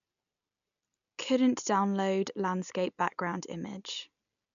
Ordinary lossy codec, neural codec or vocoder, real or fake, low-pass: none; none; real; 7.2 kHz